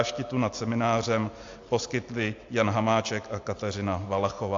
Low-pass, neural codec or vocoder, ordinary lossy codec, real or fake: 7.2 kHz; none; AAC, 48 kbps; real